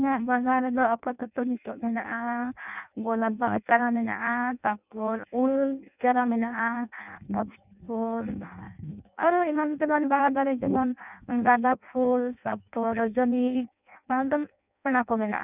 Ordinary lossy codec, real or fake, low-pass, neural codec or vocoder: none; fake; 3.6 kHz; codec, 16 kHz in and 24 kHz out, 0.6 kbps, FireRedTTS-2 codec